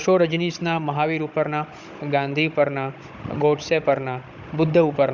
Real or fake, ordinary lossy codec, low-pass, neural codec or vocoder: fake; none; 7.2 kHz; codec, 16 kHz, 16 kbps, FunCodec, trained on Chinese and English, 50 frames a second